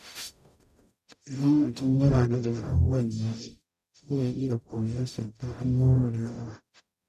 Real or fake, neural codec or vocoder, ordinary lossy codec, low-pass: fake; codec, 44.1 kHz, 0.9 kbps, DAC; none; 14.4 kHz